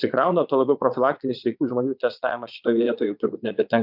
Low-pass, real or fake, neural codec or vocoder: 5.4 kHz; fake; vocoder, 22.05 kHz, 80 mel bands, Vocos